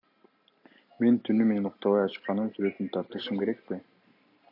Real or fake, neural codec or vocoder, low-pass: real; none; 5.4 kHz